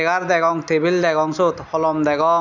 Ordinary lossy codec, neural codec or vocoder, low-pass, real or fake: none; none; 7.2 kHz; real